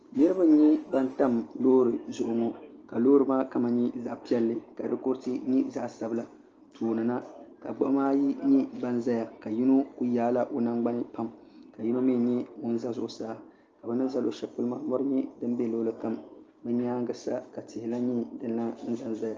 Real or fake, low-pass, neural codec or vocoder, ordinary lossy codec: real; 7.2 kHz; none; Opus, 24 kbps